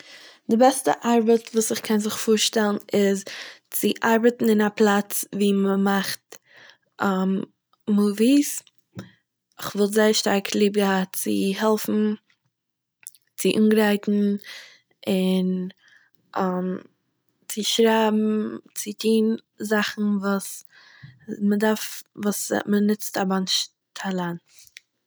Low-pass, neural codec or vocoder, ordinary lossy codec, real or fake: none; none; none; real